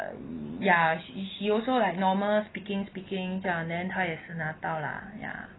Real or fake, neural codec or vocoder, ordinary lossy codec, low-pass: real; none; AAC, 16 kbps; 7.2 kHz